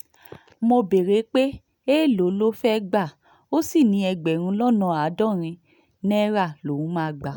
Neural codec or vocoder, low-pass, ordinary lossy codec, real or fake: none; none; none; real